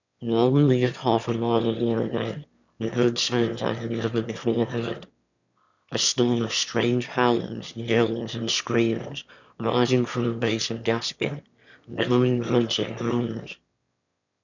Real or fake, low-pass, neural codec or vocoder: fake; 7.2 kHz; autoencoder, 22.05 kHz, a latent of 192 numbers a frame, VITS, trained on one speaker